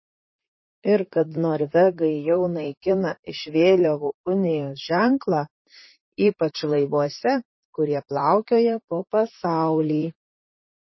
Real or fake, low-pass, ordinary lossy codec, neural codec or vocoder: fake; 7.2 kHz; MP3, 24 kbps; vocoder, 44.1 kHz, 128 mel bands, Pupu-Vocoder